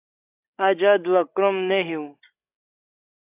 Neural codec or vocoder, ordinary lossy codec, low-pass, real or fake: codec, 16 kHz, 16 kbps, FreqCodec, larger model; AAC, 24 kbps; 3.6 kHz; fake